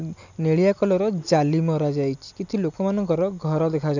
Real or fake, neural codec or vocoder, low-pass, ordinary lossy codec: real; none; 7.2 kHz; none